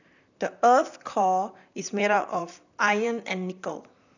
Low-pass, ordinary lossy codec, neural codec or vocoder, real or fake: 7.2 kHz; none; vocoder, 44.1 kHz, 128 mel bands, Pupu-Vocoder; fake